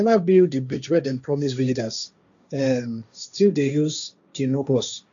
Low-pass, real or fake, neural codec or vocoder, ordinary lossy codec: 7.2 kHz; fake; codec, 16 kHz, 1.1 kbps, Voila-Tokenizer; none